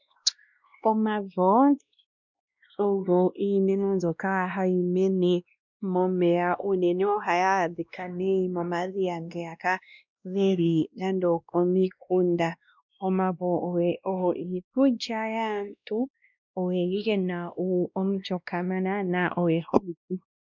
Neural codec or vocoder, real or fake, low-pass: codec, 16 kHz, 1 kbps, X-Codec, WavLM features, trained on Multilingual LibriSpeech; fake; 7.2 kHz